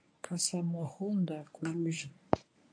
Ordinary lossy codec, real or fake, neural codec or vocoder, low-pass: AAC, 48 kbps; fake; codec, 24 kHz, 0.9 kbps, WavTokenizer, medium speech release version 1; 9.9 kHz